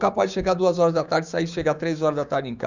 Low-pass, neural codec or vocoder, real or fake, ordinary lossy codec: 7.2 kHz; codec, 16 kHz, 6 kbps, DAC; fake; Opus, 64 kbps